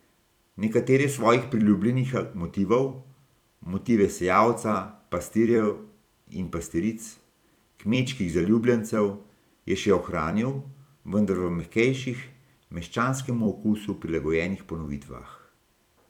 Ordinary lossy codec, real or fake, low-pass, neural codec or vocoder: none; fake; 19.8 kHz; vocoder, 44.1 kHz, 128 mel bands every 256 samples, BigVGAN v2